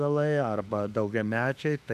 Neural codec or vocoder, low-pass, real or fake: autoencoder, 48 kHz, 32 numbers a frame, DAC-VAE, trained on Japanese speech; 14.4 kHz; fake